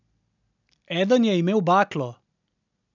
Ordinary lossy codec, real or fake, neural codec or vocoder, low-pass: none; real; none; 7.2 kHz